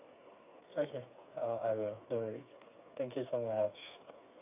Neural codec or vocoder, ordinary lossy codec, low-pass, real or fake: codec, 16 kHz, 4 kbps, FreqCodec, smaller model; AAC, 32 kbps; 3.6 kHz; fake